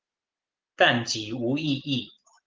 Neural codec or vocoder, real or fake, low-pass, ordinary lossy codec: none; real; 7.2 kHz; Opus, 16 kbps